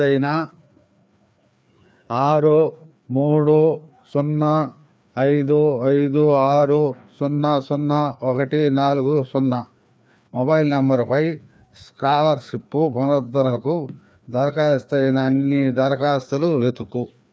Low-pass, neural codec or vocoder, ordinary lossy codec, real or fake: none; codec, 16 kHz, 2 kbps, FreqCodec, larger model; none; fake